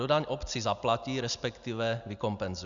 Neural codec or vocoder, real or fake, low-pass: none; real; 7.2 kHz